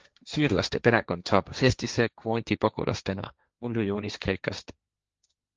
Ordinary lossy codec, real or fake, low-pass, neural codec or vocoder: Opus, 24 kbps; fake; 7.2 kHz; codec, 16 kHz, 1.1 kbps, Voila-Tokenizer